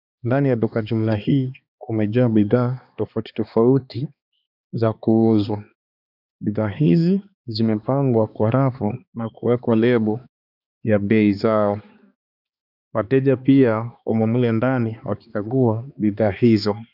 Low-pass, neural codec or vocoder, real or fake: 5.4 kHz; codec, 16 kHz, 2 kbps, X-Codec, HuBERT features, trained on balanced general audio; fake